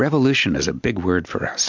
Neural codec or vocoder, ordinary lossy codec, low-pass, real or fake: none; MP3, 64 kbps; 7.2 kHz; real